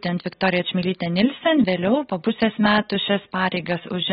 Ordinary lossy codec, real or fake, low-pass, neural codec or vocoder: AAC, 16 kbps; real; 19.8 kHz; none